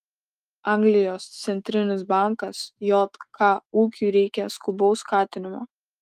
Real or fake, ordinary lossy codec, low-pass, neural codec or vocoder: fake; Opus, 32 kbps; 14.4 kHz; autoencoder, 48 kHz, 128 numbers a frame, DAC-VAE, trained on Japanese speech